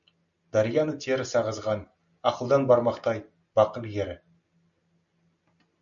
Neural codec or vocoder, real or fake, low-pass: none; real; 7.2 kHz